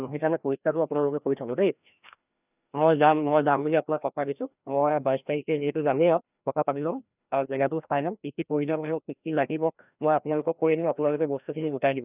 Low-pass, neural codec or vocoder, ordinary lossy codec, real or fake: 3.6 kHz; codec, 16 kHz, 1 kbps, FreqCodec, larger model; none; fake